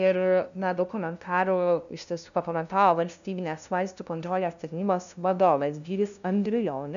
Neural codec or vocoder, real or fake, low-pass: codec, 16 kHz, 0.5 kbps, FunCodec, trained on LibriTTS, 25 frames a second; fake; 7.2 kHz